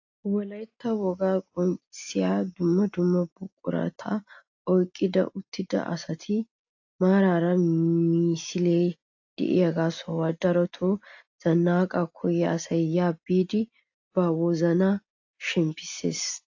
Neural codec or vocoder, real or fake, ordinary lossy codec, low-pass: none; real; AAC, 48 kbps; 7.2 kHz